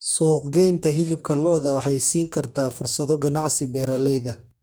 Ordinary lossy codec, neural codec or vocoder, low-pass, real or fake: none; codec, 44.1 kHz, 2.6 kbps, DAC; none; fake